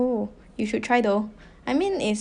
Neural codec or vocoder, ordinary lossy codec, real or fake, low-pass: none; none; real; 9.9 kHz